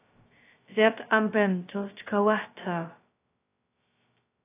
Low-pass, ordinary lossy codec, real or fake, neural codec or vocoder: 3.6 kHz; AAC, 24 kbps; fake; codec, 16 kHz, 0.2 kbps, FocalCodec